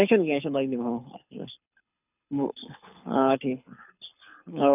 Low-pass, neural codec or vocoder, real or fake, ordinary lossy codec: 3.6 kHz; codec, 24 kHz, 6 kbps, HILCodec; fake; none